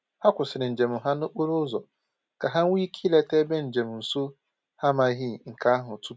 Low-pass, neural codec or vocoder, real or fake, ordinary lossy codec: none; none; real; none